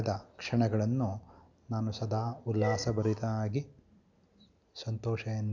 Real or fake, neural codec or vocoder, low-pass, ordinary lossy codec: real; none; 7.2 kHz; none